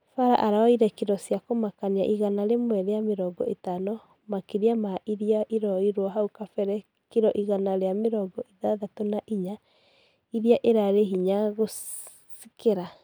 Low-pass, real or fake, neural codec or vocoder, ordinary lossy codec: none; real; none; none